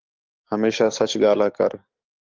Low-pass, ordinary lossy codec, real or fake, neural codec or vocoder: 7.2 kHz; Opus, 32 kbps; fake; codec, 44.1 kHz, 7.8 kbps, DAC